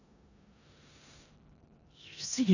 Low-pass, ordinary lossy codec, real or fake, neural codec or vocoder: 7.2 kHz; none; fake; codec, 16 kHz in and 24 kHz out, 0.9 kbps, LongCat-Audio-Codec, four codebook decoder